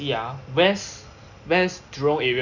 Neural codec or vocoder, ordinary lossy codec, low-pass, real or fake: none; none; 7.2 kHz; real